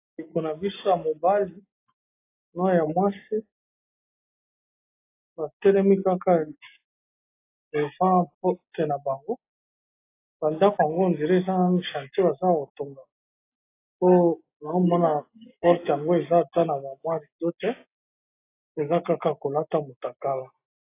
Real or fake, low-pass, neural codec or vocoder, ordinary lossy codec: real; 3.6 kHz; none; AAC, 24 kbps